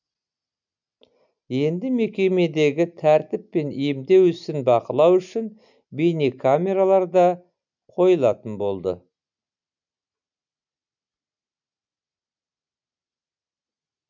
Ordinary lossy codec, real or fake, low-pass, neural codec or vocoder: none; real; 7.2 kHz; none